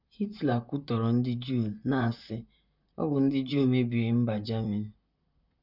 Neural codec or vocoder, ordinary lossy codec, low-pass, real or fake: vocoder, 44.1 kHz, 128 mel bands, Pupu-Vocoder; none; 5.4 kHz; fake